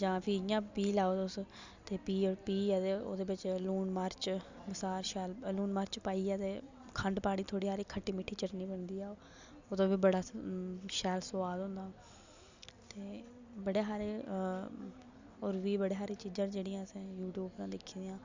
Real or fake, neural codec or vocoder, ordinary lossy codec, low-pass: real; none; Opus, 64 kbps; 7.2 kHz